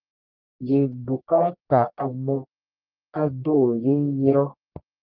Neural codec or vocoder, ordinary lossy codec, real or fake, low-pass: codec, 44.1 kHz, 1.7 kbps, Pupu-Codec; Opus, 32 kbps; fake; 5.4 kHz